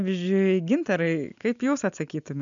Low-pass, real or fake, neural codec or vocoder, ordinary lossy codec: 7.2 kHz; real; none; MP3, 96 kbps